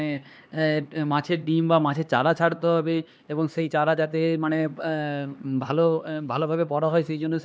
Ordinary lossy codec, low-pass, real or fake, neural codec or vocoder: none; none; fake; codec, 16 kHz, 2 kbps, X-Codec, HuBERT features, trained on LibriSpeech